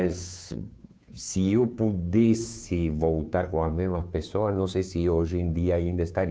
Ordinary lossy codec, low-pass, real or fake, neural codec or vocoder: none; none; fake; codec, 16 kHz, 2 kbps, FunCodec, trained on Chinese and English, 25 frames a second